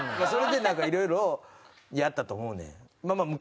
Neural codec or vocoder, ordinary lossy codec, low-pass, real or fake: none; none; none; real